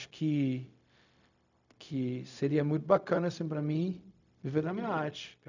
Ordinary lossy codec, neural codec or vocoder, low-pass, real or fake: none; codec, 16 kHz, 0.4 kbps, LongCat-Audio-Codec; 7.2 kHz; fake